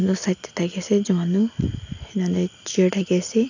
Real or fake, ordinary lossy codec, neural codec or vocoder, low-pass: real; none; none; 7.2 kHz